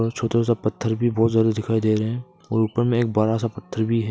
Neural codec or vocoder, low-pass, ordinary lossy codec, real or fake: none; none; none; real